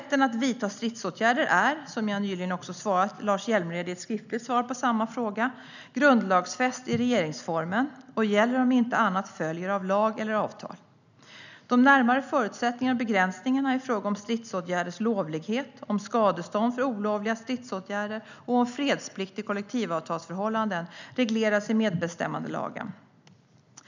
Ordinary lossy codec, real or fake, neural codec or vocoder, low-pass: none; real; none; 7.2 kHz